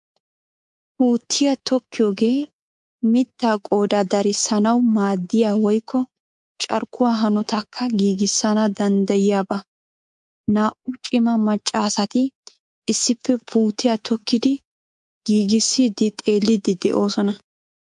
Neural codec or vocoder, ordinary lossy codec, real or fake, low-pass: codec, 24 kHz, 3.1 kbps, DualCodec; MP3, 64 kbps; fake; 10.8 kHz